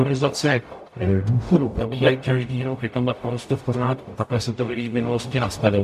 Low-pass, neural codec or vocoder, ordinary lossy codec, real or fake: 14.4 kHz; codec, 44.1 kHz, 0.9 kbps, DAC; MP3, 64 kbps; fake